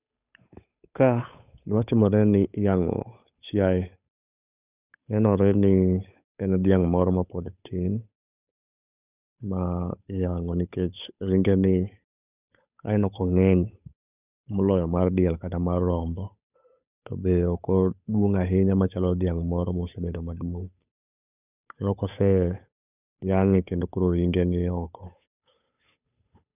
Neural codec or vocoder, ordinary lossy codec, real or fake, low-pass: codec, 16 kHz, 8 kbps, FunCodec, trained on Chinese and English, 25 frames a second; none; fake; 3.6 kHz